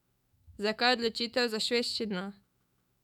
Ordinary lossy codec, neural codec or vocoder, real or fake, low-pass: none; autoencoder, 48 kHz, 128 numbers a frame, DAC-VAE, trained on Japanese speech; fake; 19.8 kHz